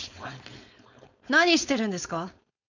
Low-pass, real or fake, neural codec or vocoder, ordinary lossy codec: 7.2 kHz; fake; codec, 16 kHz, 4.8 kbps, FACodec; none